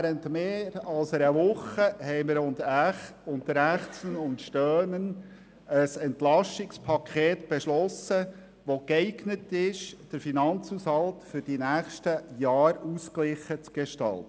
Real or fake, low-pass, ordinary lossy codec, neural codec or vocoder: real; none; none; none